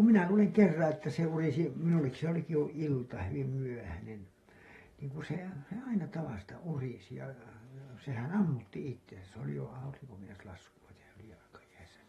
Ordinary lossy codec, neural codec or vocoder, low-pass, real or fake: AAC, 32 kbps; none; 19.8 kHz; real